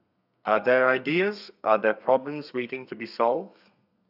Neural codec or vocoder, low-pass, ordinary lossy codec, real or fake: codec, 32 kHz, 1.9 kbps, SNAC; 5.4 kHz; none; fake